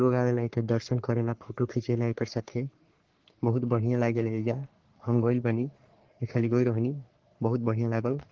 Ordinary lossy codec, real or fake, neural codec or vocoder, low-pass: Opus, 16 kbps; fake; codec, 44.1 kHz, 3.4 kbps, Pupu-Codec; 7.2 kHz